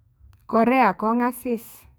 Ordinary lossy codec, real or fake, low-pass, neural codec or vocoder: none; fake; none; codec, 44.1 kHz, 2.6 kbps, SNAC